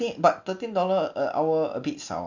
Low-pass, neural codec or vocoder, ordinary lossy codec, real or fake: 7.2 kHz; none; none; real